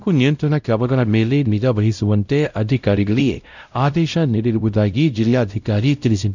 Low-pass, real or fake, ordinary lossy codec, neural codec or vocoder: 7.2 kHz; fake; none; codec, 16 kHz, 0.5 kbps, X-Codec, WavLM features, trained on Multilingual LibriSpeech